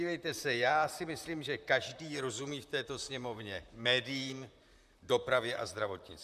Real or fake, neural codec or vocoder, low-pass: fake; vocoder, 44.1 kHz, 128 mel bands, Pupu-Vocoder; 14.4 kHz